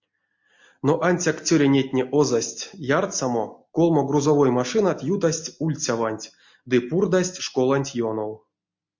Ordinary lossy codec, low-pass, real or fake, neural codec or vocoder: MP3, 48 kbps; 7.2 kHz; real; none